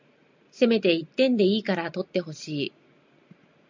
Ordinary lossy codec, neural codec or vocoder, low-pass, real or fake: MP3, 64 kbps; none; 7.2 kHz; real